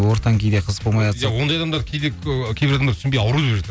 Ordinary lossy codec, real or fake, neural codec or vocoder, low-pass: none; real; none; none